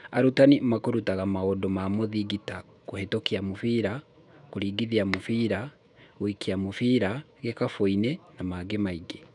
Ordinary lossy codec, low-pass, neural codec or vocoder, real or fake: none; 10.8 kHz; autoencoder, 48 kHz, 128 numbers a frame, DAC-VAE, trained on Japanese speech; fake